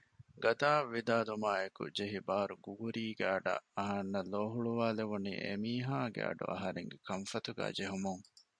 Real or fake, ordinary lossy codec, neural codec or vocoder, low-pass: real; MP3, 96 kbps; none; 9.9 kHz